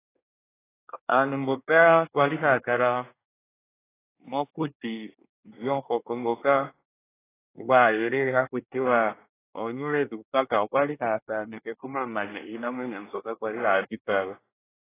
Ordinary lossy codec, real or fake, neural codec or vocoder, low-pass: AAC, 16 kbps; fake; codec, 24 kHz, 1 kbps, SNAC; 3.6 kHz